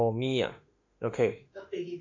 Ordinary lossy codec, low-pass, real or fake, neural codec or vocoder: AAC, 48 kbps; 7.2 kHz; fake; autoencoder, 48 kHz, 32 numbers a frame, DAC-VAE, trained on Japanese speech